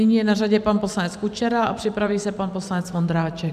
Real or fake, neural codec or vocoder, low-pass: fake; vocoder, 44.1 kHz, 128 mel bands every 512 samples, BigVGAN v2; 14.4 kHz